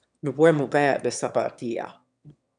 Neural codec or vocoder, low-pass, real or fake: autoencoder, 22.05 kHz, a latent of 192 numbers a frame, VITS, trained on one speaker; 9.9 kHz; fake